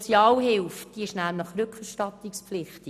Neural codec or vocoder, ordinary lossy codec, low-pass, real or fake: none; none; 14.4 kHz; real